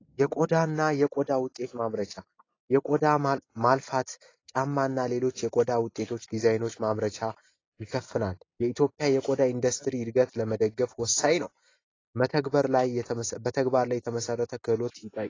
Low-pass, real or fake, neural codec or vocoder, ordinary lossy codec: 7.2 kHz; real; none; AAC, 32 kbps